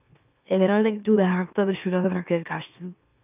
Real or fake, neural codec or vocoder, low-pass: fake; autoencoder, 44.1 kHz, a latent of 192 numbers a frame, MeloTTS; 3.6 kHz